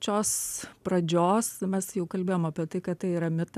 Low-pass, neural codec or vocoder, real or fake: 14.4 kHz; none; real